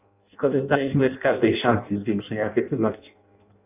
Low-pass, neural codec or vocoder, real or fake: 3.6 kHz; codec, 16 kHz in and 24 kHz out, 0.6 kbps, FireRedTTS-2 codec; fake